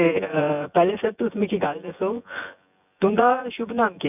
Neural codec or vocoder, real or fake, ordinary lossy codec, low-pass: vocoder, 24 kHz, 100 mel bands, Vocos; fake; none; 3.6 kHz